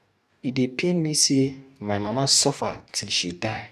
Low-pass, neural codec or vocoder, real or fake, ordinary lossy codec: 14.4 kHz; codec, 44.1 kHz, 2.6 kbps, DAC; fake; none